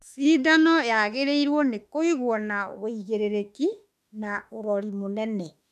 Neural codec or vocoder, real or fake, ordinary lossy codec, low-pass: autoencoder, 48 kHz, 32 numbers a frame, DAC-VAE, trained on Japanese speech; fake; none; 14.4 kHz